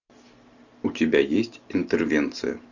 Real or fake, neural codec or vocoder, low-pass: real; none; 7.2 kHz